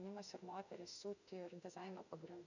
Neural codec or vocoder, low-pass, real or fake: autoencoder, 48 kHz, 32 numbers a frame, DAC-VAE, trained on Japanese speech; 7.2 kHz; fake